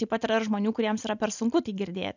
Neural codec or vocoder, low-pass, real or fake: none; 7.2 kHz; real